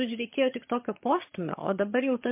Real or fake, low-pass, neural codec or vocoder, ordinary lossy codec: fake; 3.6 kHz; vocoder, 22.05 kHz, 80 mel bands, HiFi-GAN; MP3, 24 kbps